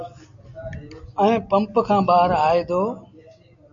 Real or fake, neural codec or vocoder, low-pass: real; none; 7.2 kHz